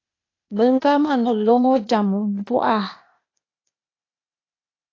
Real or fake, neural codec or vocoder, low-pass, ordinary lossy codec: fake; codec, 16 kHz, 0.8 kbps, ZipCodec; 7.2 kHz; AAC, 32 kbps